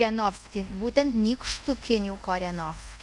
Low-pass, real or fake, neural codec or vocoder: 10.8 kHz; fake; codec, 24 kHz, 0.5 kbps, DualCodec